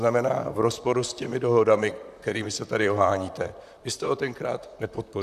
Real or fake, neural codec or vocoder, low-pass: fake; vocoder, 44.1 kHz, 128 mel bands, Pupu-Vocoder; 14.4 kHz